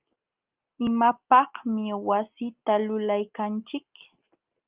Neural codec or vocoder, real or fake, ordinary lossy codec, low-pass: none; real; Opus, 32 kbps; 3.6 kHz